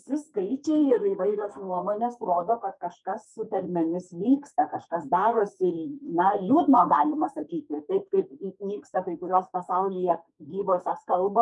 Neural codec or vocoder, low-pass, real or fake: codec, 44.1 kHz, 2.6 kbps, SNAC; 10.8 kHz; fake